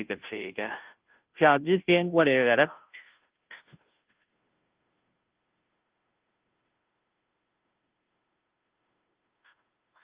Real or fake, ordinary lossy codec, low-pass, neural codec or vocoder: fake; Opus, 32 kbps; 3.6 kHz; codec, 16 kHz, 0.5 kbps, FunCodec, trained on Chinese and English, 25 frames a second